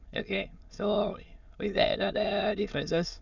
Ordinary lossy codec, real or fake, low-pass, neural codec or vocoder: none; fake; 7.2 kHz; autoencoder, 22.05 kHz, a latent of 192 numbers a frame, VITS, trained on many speakers